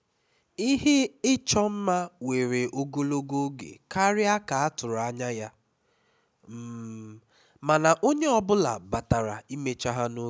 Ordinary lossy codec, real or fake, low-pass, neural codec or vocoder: none; real; none; none